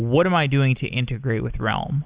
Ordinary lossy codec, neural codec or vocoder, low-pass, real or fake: Opus, 64 kbps; none; 3.6 kHz; real